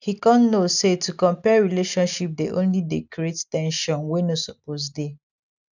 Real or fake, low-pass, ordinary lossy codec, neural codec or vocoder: real; 7.2 kHz; none; none